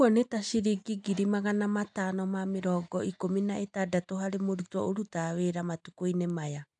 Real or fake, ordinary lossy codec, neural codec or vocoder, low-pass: real; none; none; 9.9 kHz